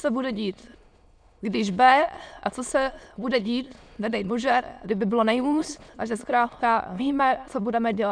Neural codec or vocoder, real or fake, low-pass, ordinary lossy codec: autoencoder, 22.05 kHz, a latent of 192 numbers a frame, VITS, trained on many speakers; fake; 9.9 kHz; MP3, 96 kbps